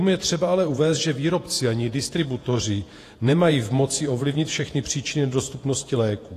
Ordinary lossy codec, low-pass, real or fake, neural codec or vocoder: AAC, 48 kbps; 14.4 kHz; fake; vocoder, 48 kHz, 128 mel bands, Vocos